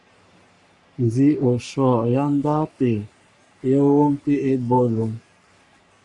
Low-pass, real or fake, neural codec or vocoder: 10.8 kHz; fake; codec, 44.1 kHz, 3.4 kbps, Pupu-Codec